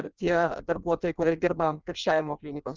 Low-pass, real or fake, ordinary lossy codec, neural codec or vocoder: 7.2 kHz; fake; Opus, 24 kbps; codec, 16 kHz in and 24 kHz out, 0.6 kbps, FireRedTTS-2 codec